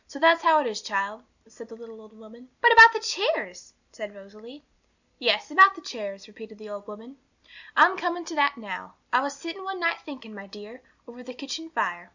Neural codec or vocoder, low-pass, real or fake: none; 7.2 kHz; real